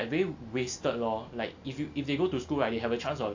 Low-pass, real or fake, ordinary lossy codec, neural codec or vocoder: 7.2 kHz; real; AAC, 48 kbps; none